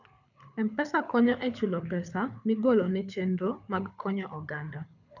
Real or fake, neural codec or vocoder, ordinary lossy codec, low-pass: fake; codec, 24 kHz, 6 kbps, HILCodec; none; 7.2 kHz